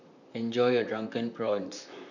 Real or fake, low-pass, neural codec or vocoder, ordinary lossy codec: fake; 7.2 kHz; vocoder, 44.1 kHz, 128 mel bands, Pupu-Vocoder; none